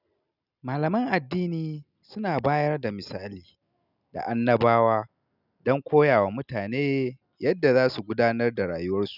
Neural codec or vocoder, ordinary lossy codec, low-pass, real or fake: none; none; 5.4 kHz; real